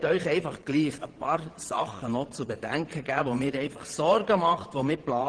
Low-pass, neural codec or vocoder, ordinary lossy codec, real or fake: 9.9 kHz; vocoder, 22.05 kHz, 80 mel bands, Vocos; Opus, 16 kbps; fake